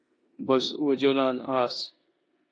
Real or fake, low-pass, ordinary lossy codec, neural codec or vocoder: fake; 9.9 kHz; AAC, 48 kbps; codec, 16 kHz in and 24 kHz out, 0.9 kbps, LongCat-Audio-Codec, four codebook decoder